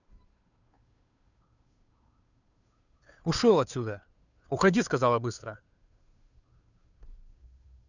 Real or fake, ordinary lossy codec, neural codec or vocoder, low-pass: fake; none; codec, 16 kHz, 2 kbps, FunCodec, trained on Chinese and English, 25 frames a second; 7.2 kHz